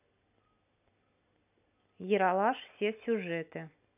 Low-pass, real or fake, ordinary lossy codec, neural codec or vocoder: 3.6 kHz; real; none; none